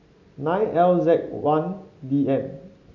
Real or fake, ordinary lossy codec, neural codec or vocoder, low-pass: real; AAC, 48 kbps; none; 7.2 kHz